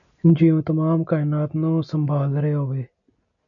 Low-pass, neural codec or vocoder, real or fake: 7.2 kHz; none; real